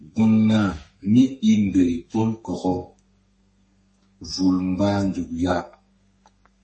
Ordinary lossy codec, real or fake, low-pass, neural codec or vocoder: MP3, 32 kbps; fake; 10.8 kHz; codec, 32 kHz, 1.9 kbps, SNAC